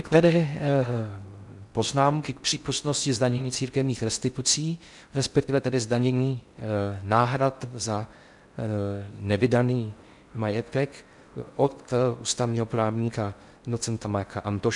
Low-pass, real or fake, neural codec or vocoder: 10.8 kHz; fake; codec, 16 kHz in and 24 kHz out, 0.6 kbps, FocalCodec, streaming, 4096 codes